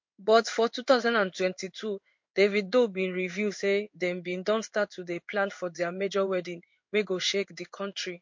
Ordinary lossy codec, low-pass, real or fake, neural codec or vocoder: MP3, 48 kbps; 7.2 kHz; fake; codec, 16 kHz in and 24 kHz out, 1 kbps, XY-Tokenizer